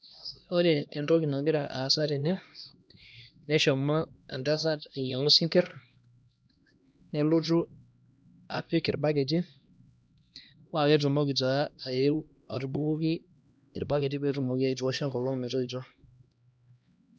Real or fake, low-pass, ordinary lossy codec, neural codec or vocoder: fake; none; none; codec, 16 kHz, 1 kbps, X-Codec, HuBERT features, trained on LibriSpeech